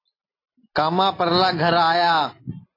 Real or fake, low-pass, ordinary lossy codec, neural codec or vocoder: real; 5.4 kHz; AAC, 24 kbps; none